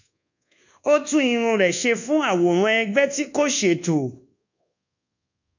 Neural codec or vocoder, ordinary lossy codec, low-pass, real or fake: codec, 24 kHz, 1.2 kbps, DualCodec; none; 7.2 kHz; fake